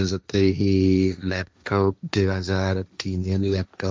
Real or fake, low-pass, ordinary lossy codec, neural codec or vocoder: fake; none; none; codec, 16 kHz, 1.1 kbps, Voila-Tokenizer